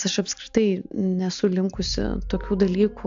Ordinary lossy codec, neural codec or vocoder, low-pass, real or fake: AAC, 64 kbps; none; 7.2 kHz; real